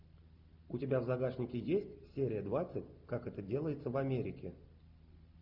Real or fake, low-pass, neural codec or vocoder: real; 5.4 kHz; none